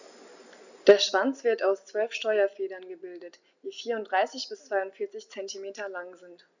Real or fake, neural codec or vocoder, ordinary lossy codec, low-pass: real; none; none; 7.2 kHz